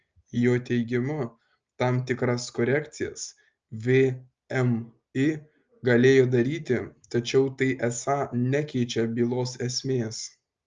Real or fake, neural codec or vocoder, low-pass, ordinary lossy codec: real; none; 7.2 kHz; Opus, 24 kbps